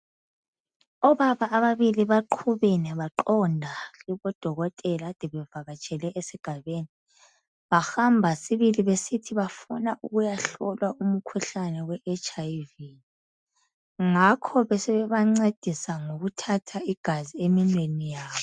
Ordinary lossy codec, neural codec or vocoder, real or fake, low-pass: MP3, 96 kbps; none; real; 9.9 kHz